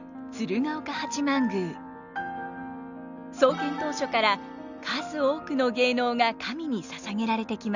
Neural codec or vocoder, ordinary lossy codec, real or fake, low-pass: none; none; real; 7.2 kHz